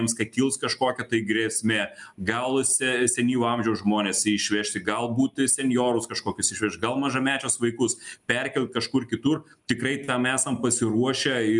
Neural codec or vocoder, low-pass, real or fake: none; 10.8 kHz; real